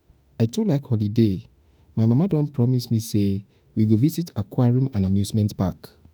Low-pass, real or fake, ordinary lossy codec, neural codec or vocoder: none; fake; none; autoencoder, 48 kHz, 32 numbers a frame, DAC-VAE, trained on Japanese speech